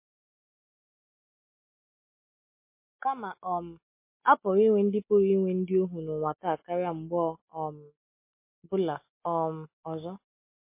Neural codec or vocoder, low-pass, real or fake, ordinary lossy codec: none; 3.6 kHz; real; MP3, 24 kbps